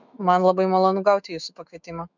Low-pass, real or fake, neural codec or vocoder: 7.2 kHz; fake; autoencoder, 48 kHz, 128 numbers a frame, DAC-VAE, trained on Japanese speech